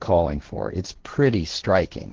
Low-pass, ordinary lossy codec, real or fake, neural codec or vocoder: 7.2 kHz; Opus, 16 kbps; fake; codec, 16 kHz, 1.1 kbps, Voila-Tokenizer